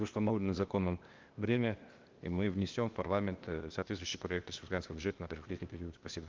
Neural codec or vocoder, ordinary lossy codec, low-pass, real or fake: codec, 16 kHz, 0.8 kbps, ZipCodec; Opus, 32 kbps; 7.2 kHz; fake